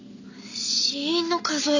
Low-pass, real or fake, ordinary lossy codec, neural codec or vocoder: 7.2 kHz; real; none; none